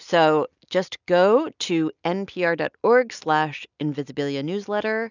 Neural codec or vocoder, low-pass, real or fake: none; 7.2 kHz; real